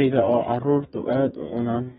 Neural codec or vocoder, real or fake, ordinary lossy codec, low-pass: codec, 32 kHz, 1.9 kbps, SNAC; fake; AAC, 16 kbps; 14.4 kHz